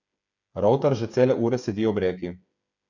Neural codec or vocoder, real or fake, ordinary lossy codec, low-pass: codec, 16 kHz, 8 kbps, FreqCodec, smaller model; fake; none; 7.2 kHz